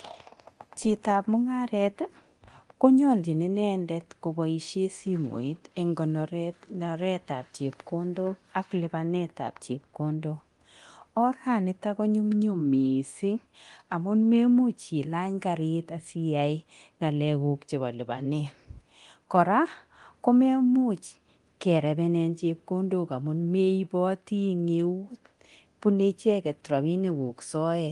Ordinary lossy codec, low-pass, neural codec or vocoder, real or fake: Opus, 24 kbps; 10.8 kHz; codec, 24 kHz, 0.9 kbps, DualCodec; fake